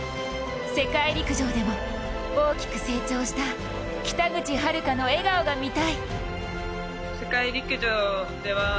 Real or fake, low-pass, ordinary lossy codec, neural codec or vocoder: real; none; none; none